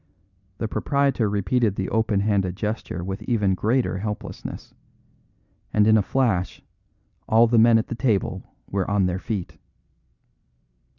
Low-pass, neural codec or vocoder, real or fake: 7.2 kHz; none; real